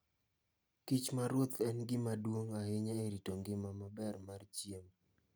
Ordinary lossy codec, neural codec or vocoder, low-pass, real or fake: none; none; none; real